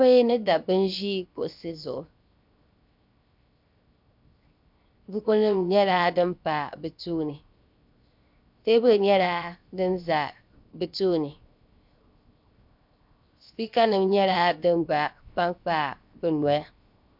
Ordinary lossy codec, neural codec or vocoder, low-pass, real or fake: MP3, 48 kbps; codec, 16 kHz, 0.7 kbps, FocalCodec; 5.4 kHz; fake